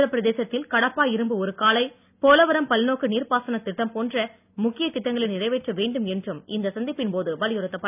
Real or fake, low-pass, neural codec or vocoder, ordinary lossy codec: real; 3.6 kHz; none; none